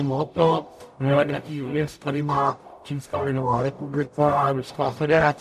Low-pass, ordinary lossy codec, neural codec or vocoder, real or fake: 14.4 kHz; AAC, 96 kbps; codec, 44.1 kHz, 0.9 kbps, DAC; fake